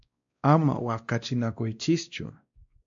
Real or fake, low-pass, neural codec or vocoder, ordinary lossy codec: fake; 7.2 kHz; codec, 16 kHz, 1 kbps, X-Codec, WavLM features, trained on Multilingual LibriSpeech; AAC, 64 kbps